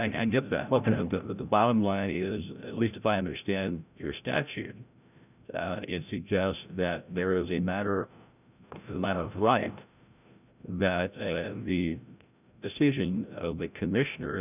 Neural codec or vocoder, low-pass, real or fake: codec, 16 kHz, 0.5 kbps, FreqCodec, larger model; 3.6 kHz; fake